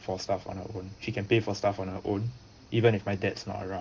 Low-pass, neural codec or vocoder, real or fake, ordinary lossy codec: 7.2 kHz; none; real; Opus, 16 kbps